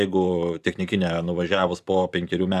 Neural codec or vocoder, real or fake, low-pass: vocoder, 44.1 kHz, 128 mel bands every 512 samples, BigVGAN v2; fake; 14.4 kHz